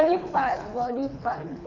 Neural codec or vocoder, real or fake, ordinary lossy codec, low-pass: codec, 24 kHz, 3 kbps, HILCodec; fake; none; 7.2 kHz